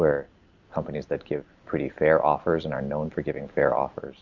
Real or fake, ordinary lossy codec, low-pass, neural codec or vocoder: real; Opus, 64 kbps; 7.2 kHz; none